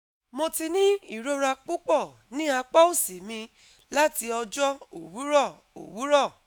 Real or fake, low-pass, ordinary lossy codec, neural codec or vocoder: fake; none; none; autoencoder, 48 kHz, 128 numbers a frame, DAC-VAE, trained on Japanese speech